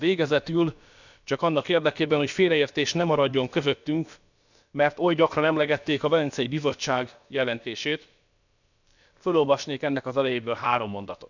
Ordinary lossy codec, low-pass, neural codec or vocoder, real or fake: none; 7.2 kHz; codec, 16 kHz, about 1 kbps, DyCAST, with the encoder's durations; fake